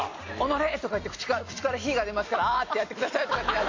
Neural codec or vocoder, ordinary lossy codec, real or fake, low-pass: none; AAC, 32 kbps; real; 7.2 kHz